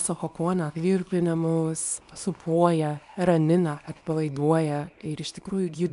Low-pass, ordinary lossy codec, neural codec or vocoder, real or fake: 10.8 kHz; MP3, 96 kbps; codec, 24 kHz, 0.9 kbps, WavTokenizer, small release; fake